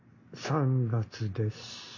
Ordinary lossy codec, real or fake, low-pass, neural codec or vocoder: MP3, 48 kbps; real; 7.2 kHz; none